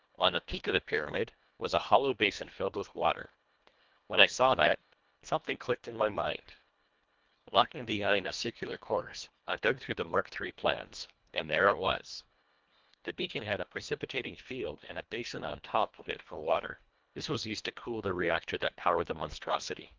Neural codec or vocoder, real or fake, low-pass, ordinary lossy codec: codec, 24 kHz, 1.5 kbps, HILCodec; fake; 7.2 kHz; Opus, 24 kbps